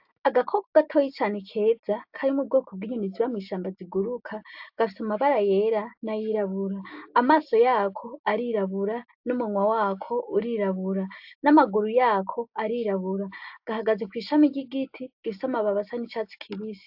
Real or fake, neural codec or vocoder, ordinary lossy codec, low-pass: real; none; AAC, 48 kbps; 5.4 kHz